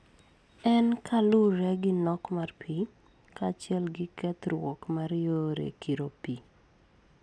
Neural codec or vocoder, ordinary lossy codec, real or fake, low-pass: none; none; real; none